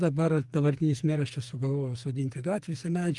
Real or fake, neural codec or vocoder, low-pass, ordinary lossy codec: fake; codec, 44.1 kHz, 2.6 kbps, SNAC; 10.8 kHz; Opus, 32 kbps